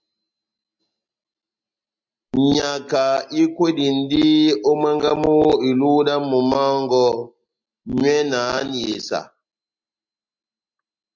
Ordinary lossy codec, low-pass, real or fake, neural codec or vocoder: MP3, 64 kbps; 7.2 kHz; real; none